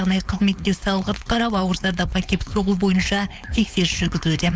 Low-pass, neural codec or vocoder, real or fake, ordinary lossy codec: none; codec, 16 kHz, 4.8 kbps, FACodec; fake; none